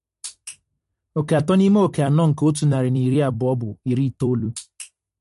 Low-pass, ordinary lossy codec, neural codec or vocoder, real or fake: 14.4 kHz; MP3, 48 kbps; vocoder, 44.1 kHz, 128 mel bands every 256 samples, BigVGAN v2; fake